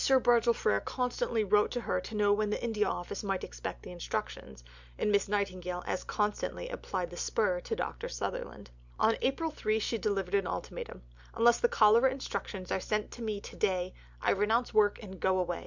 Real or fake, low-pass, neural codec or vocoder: real; 7.2 kHz; none